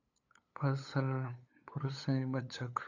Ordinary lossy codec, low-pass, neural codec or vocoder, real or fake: AAC, 48 kbps; 7.2 kHz; codec, 16 kHz, 8 kbps, FunCodec, trained on LibriTTS, 25 frames a second; fake